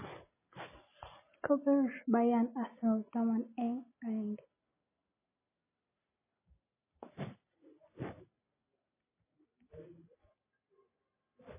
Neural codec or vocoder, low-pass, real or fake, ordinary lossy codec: none; 3.6 kHz; real; MP3, 16 kbps